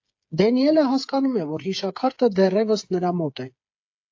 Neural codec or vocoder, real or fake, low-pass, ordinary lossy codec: codec, 16 kHz, 8 kbps, FreqCodec, smaller model; fake; 7.2 kHz; AAC, 48 kbps